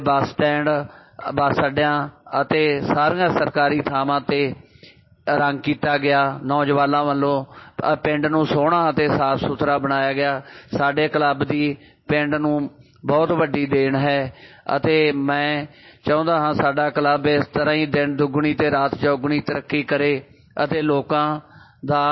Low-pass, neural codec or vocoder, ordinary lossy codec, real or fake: 7.2 kHz; none; MP3, 24 kbps; real